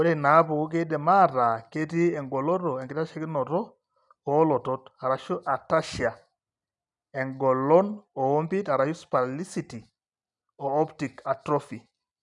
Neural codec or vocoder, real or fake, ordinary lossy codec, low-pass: none; real; none; 10.8 kHz